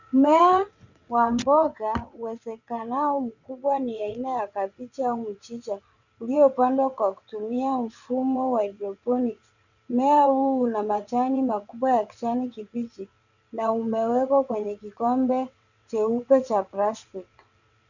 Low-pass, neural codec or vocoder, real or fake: 7.2 kHz; vocoder, 22.05 kHz, 80 mel bands, WaveNeXt; fake